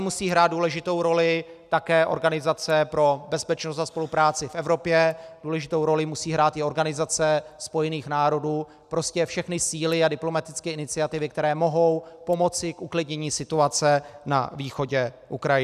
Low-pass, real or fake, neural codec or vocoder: 14.4 kHz; real; none